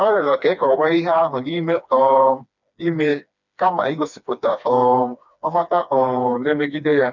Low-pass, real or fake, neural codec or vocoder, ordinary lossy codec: 7.2 kHz; fake; codec, 16 kHz, 2 kbps, FreqCodec, smaller model; none